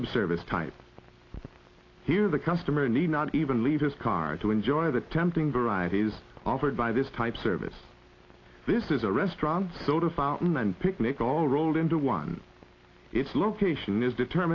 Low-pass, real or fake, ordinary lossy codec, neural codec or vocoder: 7.2 kHz; real; AAC, 32 kbps; none